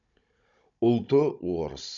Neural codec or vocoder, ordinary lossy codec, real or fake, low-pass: codec, 16 kHz, 16 kbps, FunCodec, trained on Chinese and English, 50 frames a second; none; fake; 7.2 kHz